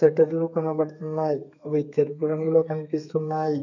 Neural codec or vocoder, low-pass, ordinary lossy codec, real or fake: codec, 32 kHz, 1.9 kbps, SNAC; 7.2 kHz; none; fake